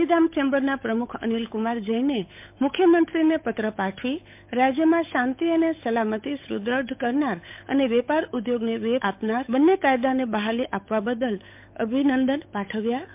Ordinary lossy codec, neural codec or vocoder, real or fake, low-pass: MP3, 32 kbps; codec, 16 kHz, 8 kbps, FunCodec, trained on Chinese and English, 25 frames a second; fake; 3.6 kHz